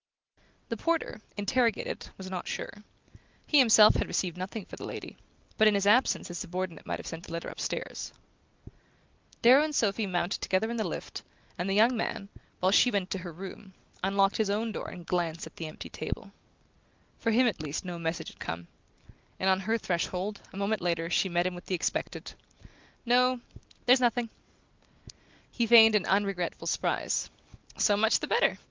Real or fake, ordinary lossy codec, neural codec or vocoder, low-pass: real; Opus, 32 kbps; none; 7.2 kHz